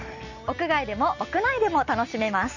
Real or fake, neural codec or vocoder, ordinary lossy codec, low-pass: real; none; none; 7.2 kHz